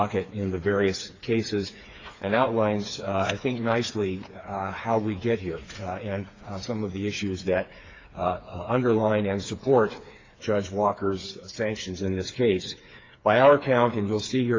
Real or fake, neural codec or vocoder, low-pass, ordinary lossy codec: fake; codec, 16 kHz, 4 kbps, FreqCodec, smaller model; 7.2 kHz; AAC, 32 kbps